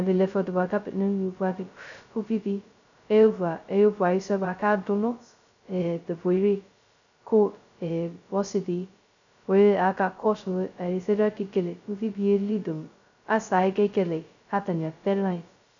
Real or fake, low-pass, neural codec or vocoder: fake; 7.2 kHz; codec, 16 kHz, 0.2 kbps, FocalCodec